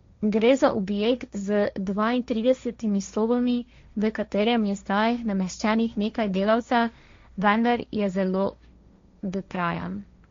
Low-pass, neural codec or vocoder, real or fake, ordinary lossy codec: 7.2 kHz; codec, 16 kHz, 1.1 kbps, Voila-Tokenizer; fake; MP3, 48 kbps